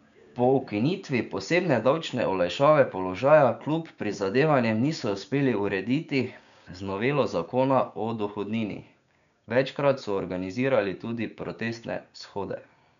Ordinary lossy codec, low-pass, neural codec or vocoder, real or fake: MP3, 96 kbps; 7.2 kHz; codec, 16 kHz, 6 kbps, DAC; fake